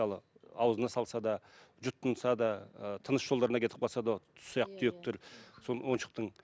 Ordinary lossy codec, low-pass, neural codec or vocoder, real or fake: none; none; none; real